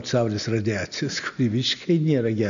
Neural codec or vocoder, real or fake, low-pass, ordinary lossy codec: none; real; 7.2 kHz; MP3, 96 kbps